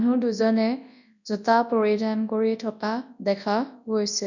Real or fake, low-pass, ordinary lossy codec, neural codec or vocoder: fake; 7.2 kHz; none; codec, 24 kHz, 0.9 kbps, WavTokenizer, large speech release